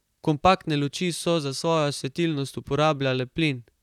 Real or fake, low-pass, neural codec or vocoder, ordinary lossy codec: fake; 19.8 kHz; vocoder, 44.1 kHz, 128 mel bands, Pupu-Vocoder; none